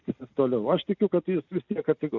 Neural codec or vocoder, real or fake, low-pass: none; real; 7.2 kHz